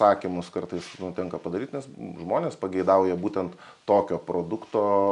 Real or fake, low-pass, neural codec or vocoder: real; 10.8 kHz; none